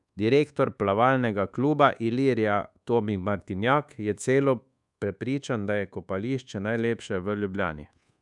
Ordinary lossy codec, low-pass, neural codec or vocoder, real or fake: none; 10.8 kHz; codec, 24 kHz, 1.2 kbps, DualCodec; fake